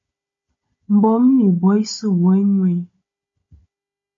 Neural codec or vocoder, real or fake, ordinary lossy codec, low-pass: codec, 16 kHz, 16 kbps, FunCodec, trained on Chinese and English, 50 frames a second; fake; MP3, 32 kbps; 7.2 kHz